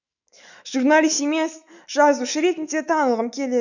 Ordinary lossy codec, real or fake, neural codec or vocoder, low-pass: none; fake; autoencoder, 48 kHz, 128 numbers a frame, DAC-VAE, trained on Japanese speech; 7.2 kHz